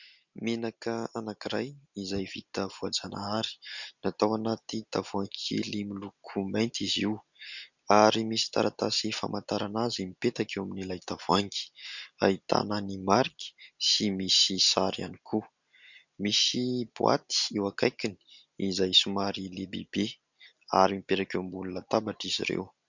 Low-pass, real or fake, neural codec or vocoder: 7.2 kHz; real; none